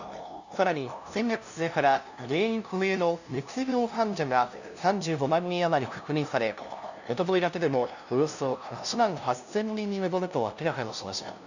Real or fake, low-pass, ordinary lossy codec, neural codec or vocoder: fake; 7.2 kHz; none; codec, 16 kHz, 0.5 kbps, FunCodec, trained on LibriTTS, 25 frames a second